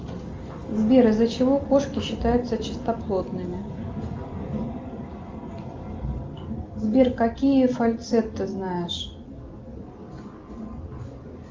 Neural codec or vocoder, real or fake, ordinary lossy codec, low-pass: none; real; Opus, 32 kbps; 7.2 kHz